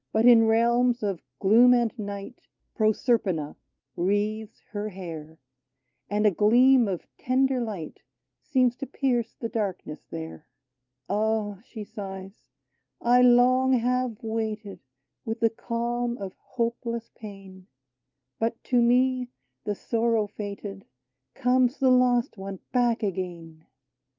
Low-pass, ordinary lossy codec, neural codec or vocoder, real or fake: 7.2 kHz; Opus, 24 kbps; none; real